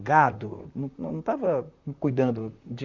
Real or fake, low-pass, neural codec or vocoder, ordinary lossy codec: fake; 7.2 kHz; vocoder, 44.1 kHz, 128 mel bands, Pupu-Vocoder; Opus, 64 kbps